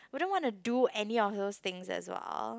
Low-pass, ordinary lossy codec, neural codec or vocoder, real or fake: none; none; none; real